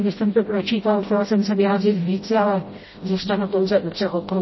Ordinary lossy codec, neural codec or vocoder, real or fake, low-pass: MP3, 24 kbps; codec, 16 kHz, 0.5 kbps, FreqCodec, smaller model; fake; 7.2 kHz